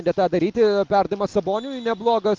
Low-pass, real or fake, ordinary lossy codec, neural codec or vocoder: 7.2 kHz; real; Opus, 32 kbps; none